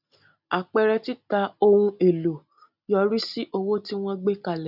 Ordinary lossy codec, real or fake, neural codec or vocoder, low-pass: none; real; none; 5.4 kHz